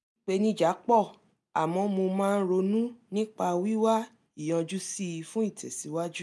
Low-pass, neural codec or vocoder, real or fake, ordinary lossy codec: none; none; real; none